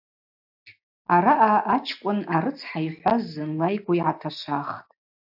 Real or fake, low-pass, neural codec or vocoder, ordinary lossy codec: real; 5.4 kHz; none; AAC, 48 kbps